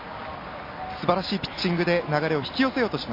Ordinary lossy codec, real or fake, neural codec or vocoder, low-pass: MP3, 32 kbps; real; none; 5.4 kHz